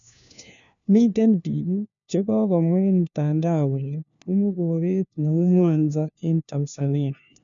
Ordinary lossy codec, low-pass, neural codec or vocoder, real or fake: none; 7.2 kHz; codec, 16 kHz, 1 kbps, FunCodec, trained on LibriTTS, 50 frames a second; fake